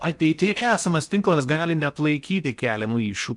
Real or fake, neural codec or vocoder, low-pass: fake; codec, 16 kHz in and 24 kHz out, 0.8 kbps, FocalCodec, streaming, 65536 codes; 10.8 kHz